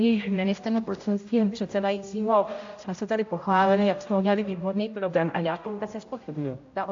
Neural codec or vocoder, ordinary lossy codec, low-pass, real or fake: codec, 16 kHz, 0.5 kbps, X-Codec, HuBERT features, trained on general audio; AAC, 48 kbps; 7.2 kHz; fake